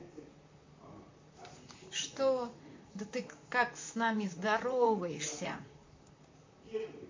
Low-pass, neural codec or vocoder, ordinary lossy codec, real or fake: 7.2 kHz; vocoder, 44.1 kHz, 128 mel bands, Pupu-Vocoder; MP3, 48 kbps; fake